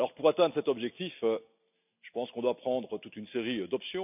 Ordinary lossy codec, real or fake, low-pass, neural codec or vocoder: none; real; 3.6 kHz; none